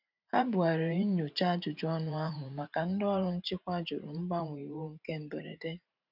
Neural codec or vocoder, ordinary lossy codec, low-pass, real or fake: vocoder, 44.1 kHz, 128 mel bands every 512 samples, BigVGAN v2; none; 5.4 kHz; fake